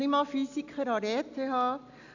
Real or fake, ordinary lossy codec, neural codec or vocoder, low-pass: real; none; none; 7.2 kHz